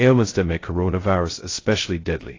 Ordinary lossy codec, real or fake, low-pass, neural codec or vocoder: AAC, 32 kbps; fake; 7.2 kHz; codec, 16 kHz, 0.2 kbps, FocalCodec